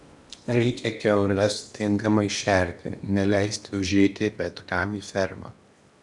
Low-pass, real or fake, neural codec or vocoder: 10.8 kHz; fake; codec, 16 kHz in and 24 kHz out, 0.8 kbps, FocalCodec, streaming, 65536 codes